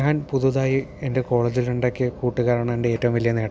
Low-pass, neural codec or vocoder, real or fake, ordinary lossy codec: none; none; real; none